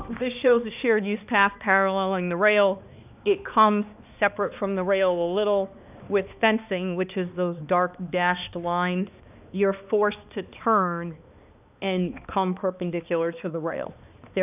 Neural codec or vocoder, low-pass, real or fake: codec, 16 kHz, 2 kbps, X-Codec, HuBERT features, trained on balanced general audio; 3.6 kHz; fake